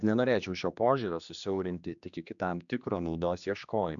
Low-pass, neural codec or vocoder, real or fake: 7.2 kHz; codec, 16 kHz, 2 kbps, X-Codec, HuBERT features, trained on general audio; fake